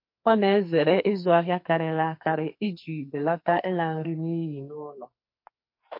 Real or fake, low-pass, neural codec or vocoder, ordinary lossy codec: fake; 5.4 kHz; codec, 44.1 kHz, 2.6 kbps, SNAC; MP3, 32 kbps